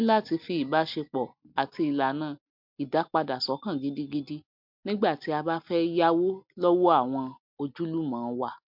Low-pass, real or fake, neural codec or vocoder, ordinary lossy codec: 5.4 kHz; real; none; MP3, 48 kbps